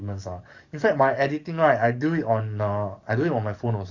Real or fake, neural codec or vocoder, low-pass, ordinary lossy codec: fake; codec, 44.1 kHz, 7.8 kbps, DAC; 7.2 kHz; Opus, 64 kbps